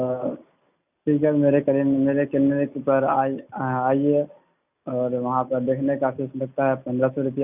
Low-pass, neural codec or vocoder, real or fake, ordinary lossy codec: 3.6 kHz; none; real; none